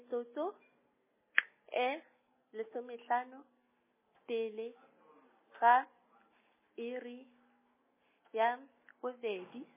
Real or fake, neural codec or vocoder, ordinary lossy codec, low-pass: real; none; MP3, 16 kbps; 3.6 kHz